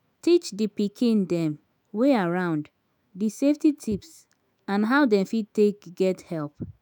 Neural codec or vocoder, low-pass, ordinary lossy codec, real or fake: autoencoder, 48 kHz, 128 numbers a frame, DAC-VAE, trained on Japanese speech; none; none; fake